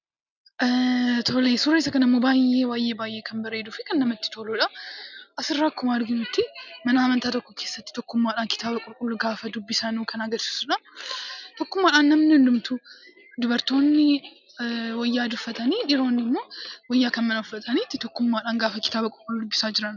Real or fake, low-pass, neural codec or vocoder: real; 7.2 kHz; none